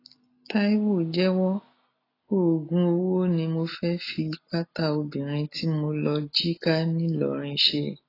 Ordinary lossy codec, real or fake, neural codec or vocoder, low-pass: AAC, 24 kbps; real; none; 5.4 kHz